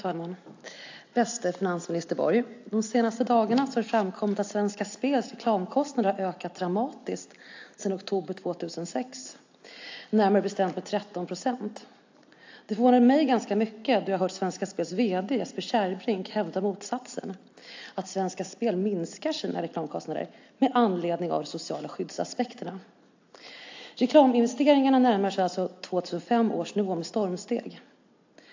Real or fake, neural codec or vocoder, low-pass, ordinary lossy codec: real; none; 7.2 kHz; AAC, 48 kbps